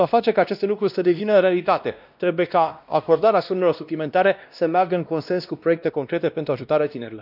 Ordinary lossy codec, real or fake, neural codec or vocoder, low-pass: none; fake; codec, 16 kHz, 1 kbps, X-Codec, WavLM features, trained on Multilingual LibriSpeech; 5.4 kHz